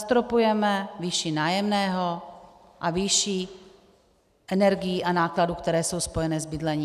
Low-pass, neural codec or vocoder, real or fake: 14.4 kHz; none; real